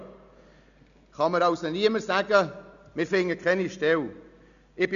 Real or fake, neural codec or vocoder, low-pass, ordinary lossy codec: real; none; 7.2 kHz; none